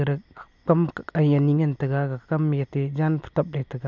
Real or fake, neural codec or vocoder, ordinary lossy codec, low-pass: real; none; none; 7.2 kHz